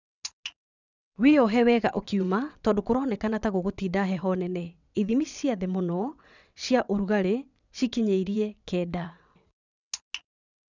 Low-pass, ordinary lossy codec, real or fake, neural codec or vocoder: 7.2 kHz; none; fake; vocoder, 22.05 kHz, 80 mel bands, WaveNeXt